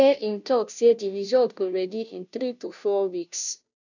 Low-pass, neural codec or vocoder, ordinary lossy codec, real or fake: 7.2 kHz; codec, 16 kHz, 0.5 kbps, FunCodec, trained on Chinese and English, 25 frames a second; none; fake